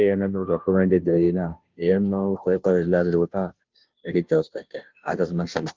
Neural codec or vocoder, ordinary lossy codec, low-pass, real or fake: codec, 16 kHz, 0.5 kbps, FunCodec, trained on Chinese and English, 25 frames a second; Opus, 32 kbps; 7.2 kHz; fake